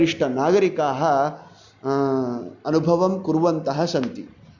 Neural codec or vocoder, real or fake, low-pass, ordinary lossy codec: none; real; 7.2 kHz; Opus, 64 kbps